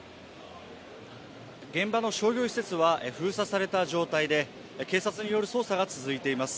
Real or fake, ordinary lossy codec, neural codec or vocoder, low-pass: real; none; none; none